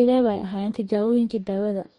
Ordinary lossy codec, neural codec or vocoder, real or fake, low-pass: MP3, 48 kbps; codec, 44.1 kHz, 2.6 kbps, DAC; fake; 19.8 kHz